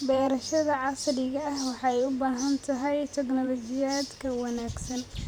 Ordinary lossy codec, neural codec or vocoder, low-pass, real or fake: none; vocoder, 44.1 kHz, 128 mel bands every 512 samples, BigVGAN v2; none; fake